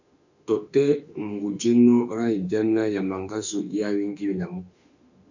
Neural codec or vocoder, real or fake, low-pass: autoencoder, 48 kHz, 32 numbers a frame, DAC-VAE, trained on Japanese speech; fake; 7.2 kHz